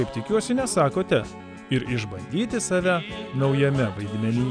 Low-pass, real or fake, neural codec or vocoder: 9.9 kHz; real; none